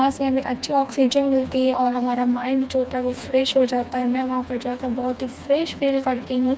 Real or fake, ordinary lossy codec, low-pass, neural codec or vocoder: fake; none; none; codec, 16 kHz, 2 kbps, FreqCodec, smaller model